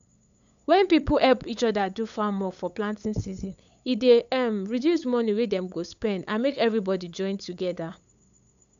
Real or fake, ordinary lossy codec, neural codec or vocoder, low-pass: fake; none; codec, 16 kHz, 8 kbps, FunCodec, trained on LibriTTS, 25 frames a second; 7.2 kHz